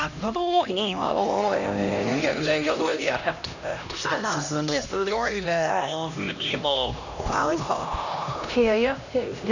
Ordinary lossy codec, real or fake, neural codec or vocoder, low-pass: none; fake; codec, 16 kHz, 1 kbps, X-Codec, HuBERT features, trained on LibriSpeech; 7.2 kHz